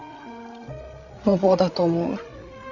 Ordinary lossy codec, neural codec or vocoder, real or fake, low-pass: none; codec, 16 kHz, 16 kbps, FreqCodec, larger model; fake; 7.2 kHz